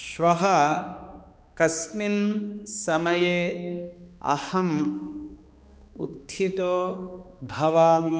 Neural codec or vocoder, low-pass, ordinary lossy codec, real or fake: codec, 16 kHz, 2 kbps, X-Codec, HuBERT features, trained on balanced general audio; none; none; fake